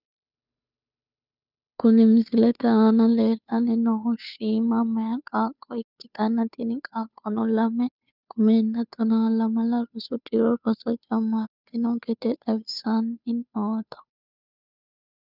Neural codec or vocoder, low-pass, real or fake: codec, 16 kHz, 2 kbps, FunCodec, trained on Chinese and English, 25 frames a second; 5.4 kHz; fake